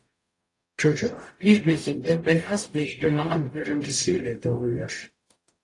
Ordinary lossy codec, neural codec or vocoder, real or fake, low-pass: AAC, 48 kbps; codec, 44.1 kHz, 0.9 kbps, DAC; fake; 10.8 kHz